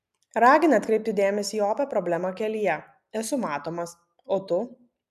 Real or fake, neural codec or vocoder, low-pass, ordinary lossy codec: real; none; 14.4 kHz; MP3, 96 kbps